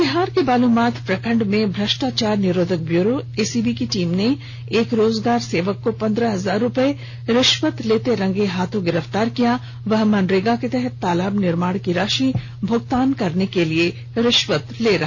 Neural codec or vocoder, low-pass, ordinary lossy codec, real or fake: none; 7.2 kHz; none; real